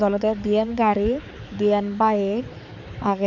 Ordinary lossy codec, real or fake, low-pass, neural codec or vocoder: none; fake; 7.2 kHz; codec, 16 kHz, 4 kbps, X-Codec, HuBERT features, trained on balanced general audio